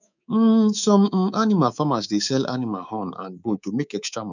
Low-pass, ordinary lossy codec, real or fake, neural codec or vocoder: 7.2 kHz; none; fake; codec, 24 kHz, 3.1 kbps, DualCodec